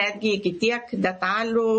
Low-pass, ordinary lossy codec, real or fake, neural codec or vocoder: 10.8 kHz; MP3, 32 kbps; real; none